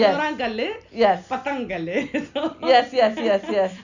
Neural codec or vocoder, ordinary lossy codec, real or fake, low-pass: none; none; real; 7.2 kHz